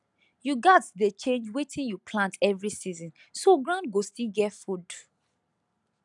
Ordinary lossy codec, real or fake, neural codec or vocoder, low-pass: none; real; none; 10.8 kHz